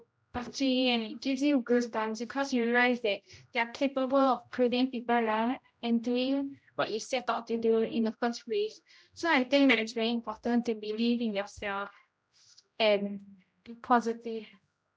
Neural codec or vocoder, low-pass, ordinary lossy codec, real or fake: codec, 16 kHz, 0.5 kbps, X-Codec, HuBERT features, trained on general audio; none; none; fake